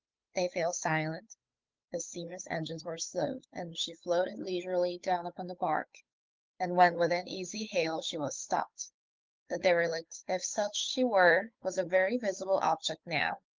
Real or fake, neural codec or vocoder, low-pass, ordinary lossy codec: fake; codec, 16 kHz, 8 kbps, FunCodec, trained on Chinese and English, 25 frames a second; 7.2 kHz; Opus, 32 kbps